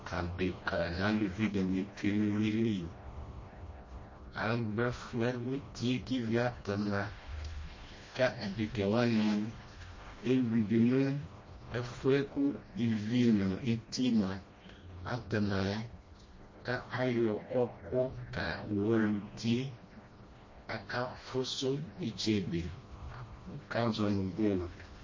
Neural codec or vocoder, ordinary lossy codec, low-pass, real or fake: codec, 16 kHz, 1 kbps, FreqCodec, smaller model; MP3, 32 kbps; 7.2 kHz; fake